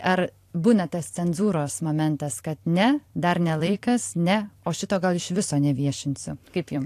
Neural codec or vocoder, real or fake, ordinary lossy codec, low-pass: vocoder, 44.1 kHz, 128 mel bands every 256 samples, BigVGAN v2; fake; AAC, 64 kbps; 14.4 kHz